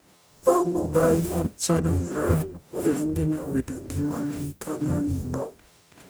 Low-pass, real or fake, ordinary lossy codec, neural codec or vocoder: none; fake; none; codec, 44.1 kHz, 0.9 kbps, DAC